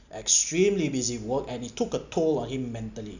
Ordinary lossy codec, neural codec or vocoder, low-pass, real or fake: none; none; 7.2 kHz; real